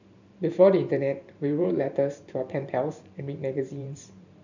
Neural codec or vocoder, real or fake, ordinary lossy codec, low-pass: vocoder, 44.1 kHz, 128 mel bands every 512 samples, BigVGAN v2; fake; none; 7.2 kHz